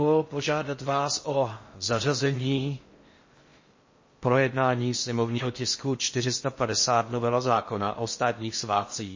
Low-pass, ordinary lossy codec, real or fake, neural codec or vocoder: 7.2 kHz; MP3, 32 kbps; fake; codec, 16 kHz in and 24 kHz out, 0.6 kbps, FocalCodec, streaming, 4096 codes